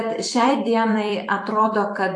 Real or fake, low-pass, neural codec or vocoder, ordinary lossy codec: fake; 10.8 kHz; vocoder, 44.1 kHz, 128 mel bands every 256 samples, BigVGAN v2; AAC, 64 kbps